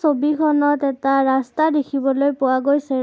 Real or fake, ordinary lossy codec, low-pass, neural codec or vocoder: real; none; none; none